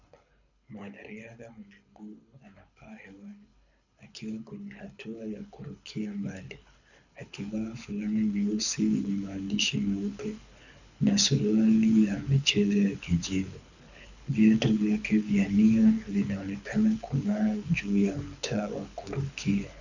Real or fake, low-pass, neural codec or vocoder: fake; 7.2 kHz; codec, 24 kHz, 6 kbps, HILCodec